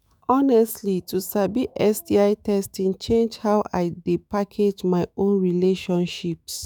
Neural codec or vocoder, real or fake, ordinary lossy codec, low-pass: autoencoder, 48 kHz, 128 numbers a frame, DAC-VAE, trained on Japanese speech; fake; none; none